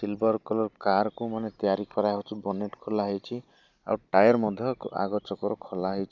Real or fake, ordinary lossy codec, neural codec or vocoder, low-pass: real; none; none; 7.2 kHz